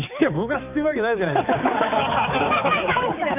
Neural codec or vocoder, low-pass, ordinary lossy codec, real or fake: codec, 16 kHz, 4 kbps, X-Codec, HuBERT features, trained on balanced general audio; 3.6 kHz; none; fake